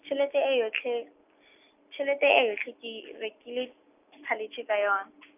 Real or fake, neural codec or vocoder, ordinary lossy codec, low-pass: real; none; none; 3.6 kHz